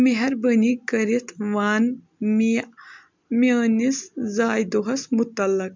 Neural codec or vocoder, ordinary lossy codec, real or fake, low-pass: none; MP3, 64 kbps; real; 7.2 kHz